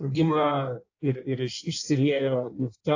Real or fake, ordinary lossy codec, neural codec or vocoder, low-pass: fake; AAC, 48 kbps; codec, 16 kHz in and 24 kHz out, 1.1 kbps, FireRedTTS-2 codec; 7.2 kHz